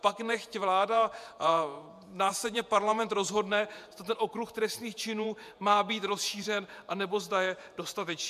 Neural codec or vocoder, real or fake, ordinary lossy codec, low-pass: vocoder, 48 kHz, 128 mel bands, Vocos; fake; MP3, 96 kbps; 14.4 kHz